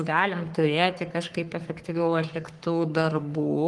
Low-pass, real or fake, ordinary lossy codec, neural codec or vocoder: 10.8 kHz; fake; Opus, 24 kbps; codec, 44.1 kHz, 3.4 kbps, Pupu-Codec